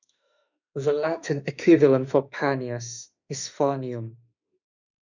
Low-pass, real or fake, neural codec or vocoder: 7.2 kHz; fake; autoencoder, 48 kHz, 32 numbers a frame, DAC-VAE, trained on Japanese speech